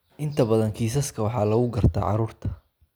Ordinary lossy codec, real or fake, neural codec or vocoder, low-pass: none; real; none; none